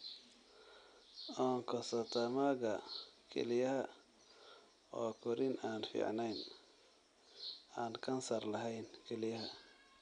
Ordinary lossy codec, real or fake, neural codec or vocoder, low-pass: none; real; none; 9.9 kHz